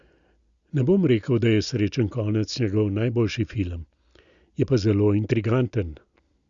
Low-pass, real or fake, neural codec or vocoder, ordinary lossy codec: 7.2 kHz; real; none; Opus, 64 kbps